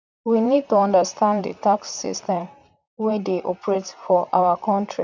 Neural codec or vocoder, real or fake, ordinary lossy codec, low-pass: vocoder, 44.1 kHz, 128 mel bands, Pupu-Vocoder; fake; none; 7.2 kHz